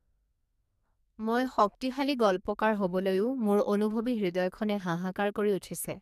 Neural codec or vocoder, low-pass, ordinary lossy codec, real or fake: codec, 44.1 kHz, 2.6 kbps, SNAC; 14.4 kHz; none; fake